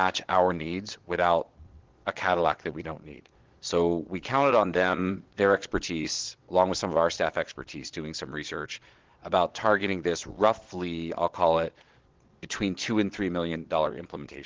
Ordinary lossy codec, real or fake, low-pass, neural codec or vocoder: Opus, 16 kbps; fake; 7.2 kHz; vocoder, 22.05 kHz, 80 mel bands, Vocos